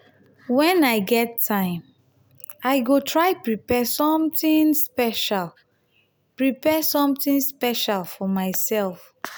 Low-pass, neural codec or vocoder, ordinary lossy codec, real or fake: none; none; none; real